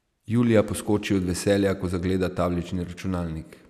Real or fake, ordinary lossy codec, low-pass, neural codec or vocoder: real; none; 14.4 kHz; none